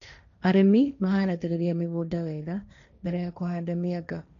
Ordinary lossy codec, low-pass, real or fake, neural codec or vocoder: none; 7.2 kHz; fake; codec, 16 kHz, 1.1 kbps, Voila-Tokenizer